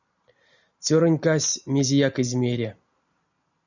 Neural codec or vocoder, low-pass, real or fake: none; 7.2 kHz; real